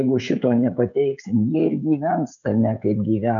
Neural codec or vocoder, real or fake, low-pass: codec, 16 kHz, 16 kbps, FreqCodec, smaller model; fake; 7.2 kHz